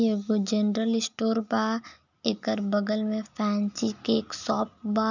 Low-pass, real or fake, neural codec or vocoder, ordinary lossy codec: 7.2 kHz; real; none; none